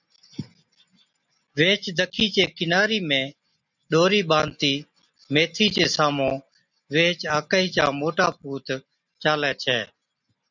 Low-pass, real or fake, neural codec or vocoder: 7.2 kHz; real; none